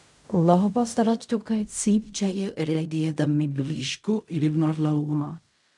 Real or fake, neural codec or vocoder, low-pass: fake; codec, 16 kHz in and 24 kHz out, 0.4 kbps, LongCat-Audio-Codec, fine tuned four codebook decoder; 10.8 kHz